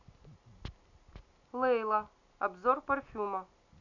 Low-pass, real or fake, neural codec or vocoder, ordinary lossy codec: 7.2 kHz; real; none; AAC, 48 kbps